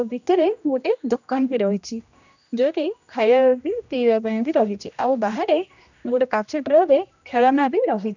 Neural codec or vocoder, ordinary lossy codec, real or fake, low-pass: codec, 16 kHz, 1 kbps, X-Codec, HuBERT features, trained on general audio; none; fake; 7.2 kHz